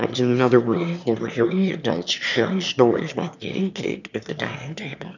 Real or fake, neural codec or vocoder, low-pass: fake; autoencoder, 22.05 kHz, a latent of 192 numbers a frame, VITS, trained on one speaker; 7.2 kHz